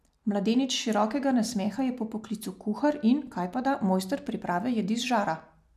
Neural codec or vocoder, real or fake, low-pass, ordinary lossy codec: none; real; 14.4 kHz; none